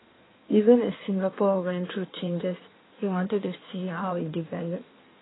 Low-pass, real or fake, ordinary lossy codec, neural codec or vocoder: 7.2 kHz; fake; AAC, 16 kbps; codec, 16 kHz in and 24 kHz out, 1.1 kbps, FireRedTTS-2 codec